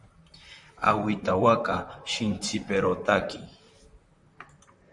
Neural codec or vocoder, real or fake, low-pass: vocoder, 44.1 kHz, 128 mel bands, Pupu-Vocoder; fake; 10.8 kHz